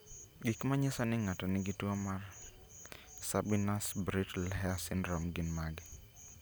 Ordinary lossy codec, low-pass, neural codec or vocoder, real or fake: none; none; none; real